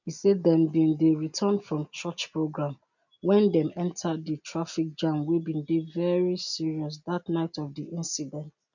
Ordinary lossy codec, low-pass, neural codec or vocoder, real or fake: none; 7.2 kHz; none; real